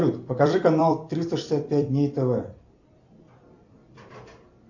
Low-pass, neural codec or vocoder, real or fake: 7.2 kHz; vocoder, 44.1 kHz, 128 mel bands every 256 samples, BigVGAN v2; fake